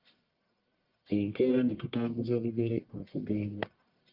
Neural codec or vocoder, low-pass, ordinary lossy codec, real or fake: codec, 44.1 kHz, 1.7 kbps, Pupu-Codec; 5.4 kHz; Opus, 24 kbps; fake